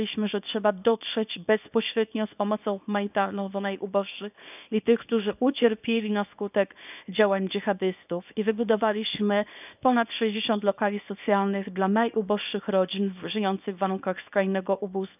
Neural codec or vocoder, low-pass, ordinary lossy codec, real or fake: codec, 24 kHz, 0.9 kbps, WavTokenizer, small release; 3.6 kHz; none; fake